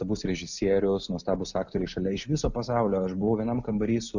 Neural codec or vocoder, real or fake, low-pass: none; real; 7.2 kHz